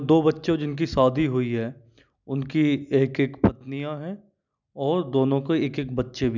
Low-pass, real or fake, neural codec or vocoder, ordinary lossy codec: 7.2 kHz; real; none; none